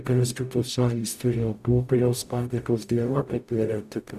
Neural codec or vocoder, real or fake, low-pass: codec, 44.1 kHz, 0.9 kbps, DAC; fake; 14.4 kHz